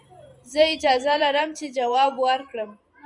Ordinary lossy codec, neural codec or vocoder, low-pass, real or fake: MP3, 96 kbps; vocoder, 44.1 kHz, 128 mel bands every 512 samples, BigVGAN v2; 10.8 kHz; fake